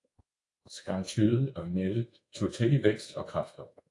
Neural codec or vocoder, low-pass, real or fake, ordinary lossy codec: codec, 24 kHz, 1.2 kbps, DualCodec; 10.8 kHz; fake; AAC, 48 kbps